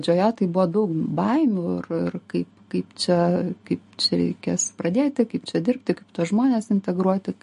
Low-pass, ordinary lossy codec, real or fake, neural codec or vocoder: 10.8 kHz; MP3, 48 kbps; real; none